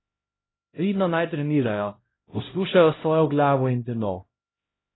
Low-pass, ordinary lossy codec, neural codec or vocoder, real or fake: 7.2 kHz; AAC, 16 kbps; codec, 16 kHz, 0.5 kbps, X-Codec, HuBERT features, trained on LibriSpeech; fake